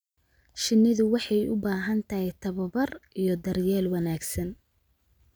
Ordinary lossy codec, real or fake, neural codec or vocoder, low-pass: none; real; none; none